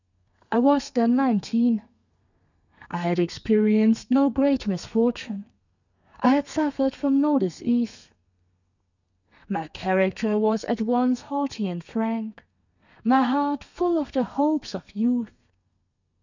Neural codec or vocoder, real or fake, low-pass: codec, 32 kHz, 1.9 kbps, SNAC; fake; 7.2 kHz